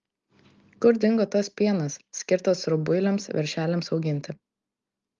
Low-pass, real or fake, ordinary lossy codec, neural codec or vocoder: 7.2 kHz; real; Opus, 32 kbps; none